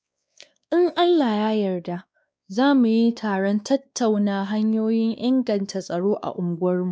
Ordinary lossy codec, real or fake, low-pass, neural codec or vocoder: none; fake; none; codec, 16 kHz, 2 kbps, X-Codec, WavLM features, trained on Multilingual LibriSpeech